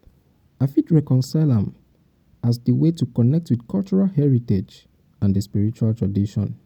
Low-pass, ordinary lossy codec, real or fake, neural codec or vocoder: 19.8 kHz; none; real; none